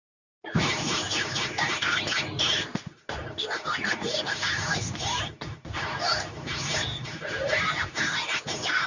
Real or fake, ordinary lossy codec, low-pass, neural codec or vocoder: fake; none; 7.2 kHz; codec, 24 kHz, 0.9 kbps, WavTokenizer, medium speech release version 2